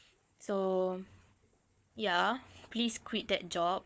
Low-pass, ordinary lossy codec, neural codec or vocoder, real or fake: none; none; codec, 16 kHz, 4 kbps, FunCodec, trained on Chinese and English, 50 frames a second; fake